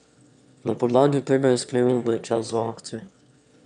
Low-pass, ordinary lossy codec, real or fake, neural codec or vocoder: 9.9 kHz; none; fake; autoencoder, 22.05 kHz, a latent of 192 numbers a frame, VITS, trained on one speaker